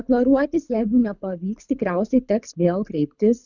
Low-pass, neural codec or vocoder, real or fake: 7.2 kHz; codec, 24 kHz, 6 kbps, HILCodec; fake